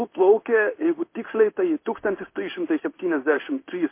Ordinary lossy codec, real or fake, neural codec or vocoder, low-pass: MP3, 24 kbps; fake; codec, 16 kHz in and 24 kHz out, 1 kbps, XY-Tokenizer; 3.6 kHz